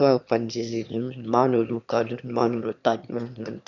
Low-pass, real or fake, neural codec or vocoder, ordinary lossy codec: 7.2 kHz; fake; autoencoder, 22.05 kHz, a latent of 192 numbers a frame, VITS, trained on one speaker; none